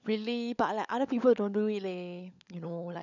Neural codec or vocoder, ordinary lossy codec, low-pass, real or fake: codec, 16 kHz, 16 kbps, FunCodec, trained on LibriTTS, 50 frames a second; none; 7.2 kHz; fake